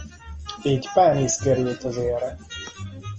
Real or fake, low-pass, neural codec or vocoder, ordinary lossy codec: real; 7.2 kHz; none; Opus, 24 kbps